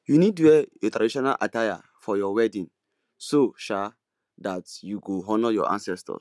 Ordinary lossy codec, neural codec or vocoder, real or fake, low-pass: none; none; real; none